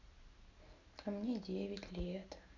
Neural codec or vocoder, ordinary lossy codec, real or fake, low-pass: none; none; real; 7.2 kHz